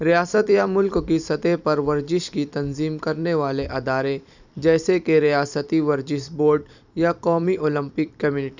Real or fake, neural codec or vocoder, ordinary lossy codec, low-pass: fake; vocoder, 44.1 kHz, 128 mel bands every 256 samples, BigVGAN v2; none; 7.2 kHz